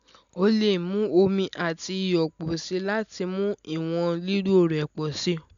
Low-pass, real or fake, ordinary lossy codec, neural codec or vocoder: 7.2 kHz; real; none; none